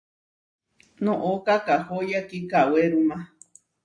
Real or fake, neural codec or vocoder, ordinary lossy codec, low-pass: real; none; MP3, 64 kbps; 9.9 kHz